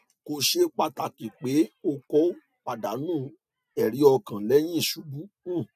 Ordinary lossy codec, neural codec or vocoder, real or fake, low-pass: none; none; real; 14.4 kHz